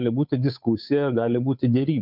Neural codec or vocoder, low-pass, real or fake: codec, 16 kHz, 8 kbps, FunCodec, trained on Chinese and English, 25 frames a second; 5.4 kHz; fake